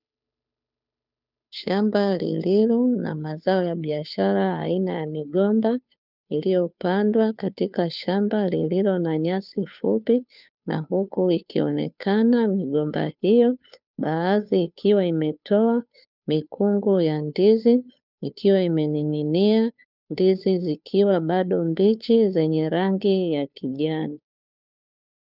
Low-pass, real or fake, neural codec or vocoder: 5.4 kHz; fake; codec, 16 kHz, 2 kbps, FunCodec, trained on Chinese and English, 25 frames a second